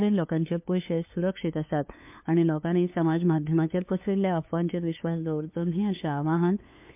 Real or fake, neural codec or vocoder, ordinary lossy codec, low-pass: fake; codec, 16 kHz, 2 kbps, FunCodec, trained on LibriTTS, 25 frames a second; MP3, 32 kbps; 3.6 kHz